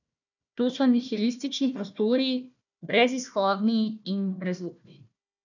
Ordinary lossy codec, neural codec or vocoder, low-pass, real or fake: none; codec, 16 kHz, 1 kbps, FunCodec, trained on Chinese and English, 50 frames a second; 7.2 kHz; fake